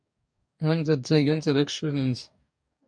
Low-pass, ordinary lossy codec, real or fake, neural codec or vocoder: 9.9 kHz; MP3, 96 kbps; fake; codec, 44.1 kHz, 2.6 kbps, DAC